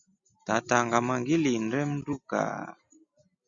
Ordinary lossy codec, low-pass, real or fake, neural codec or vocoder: Opus, 64 kbps; 7.2 kHz; real; none